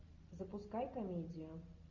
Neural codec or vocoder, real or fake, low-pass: none; real; 7.2 kHz